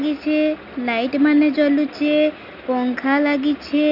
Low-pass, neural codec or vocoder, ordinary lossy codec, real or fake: 5.4 kHz; none; none; real